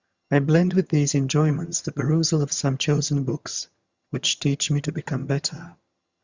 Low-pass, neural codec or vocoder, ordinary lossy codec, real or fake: 7.2 kHz; vocoder, 22.05 kHz, 80 mel bands, HiFi-GAN; Opus, 64 kbps; fake